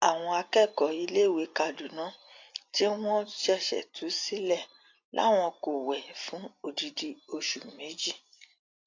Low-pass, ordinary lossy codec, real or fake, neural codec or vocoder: 7.2 kHz; AAC, 48 kbps; real; none